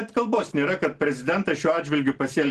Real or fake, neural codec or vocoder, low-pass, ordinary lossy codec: real; none; 10.8 kHz; Opus, 16 kbps